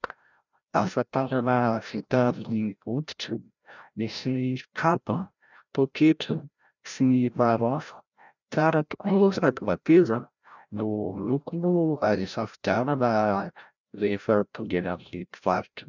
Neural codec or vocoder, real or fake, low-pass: codec, 16 kHz, 0.5 kbps, FreqCodec, larger model; fake; 7.2 kHz